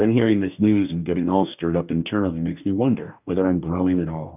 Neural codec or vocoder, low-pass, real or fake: codec, 44.1 kHz, 2.6 kbps, DAC; 3.6 kHz; fake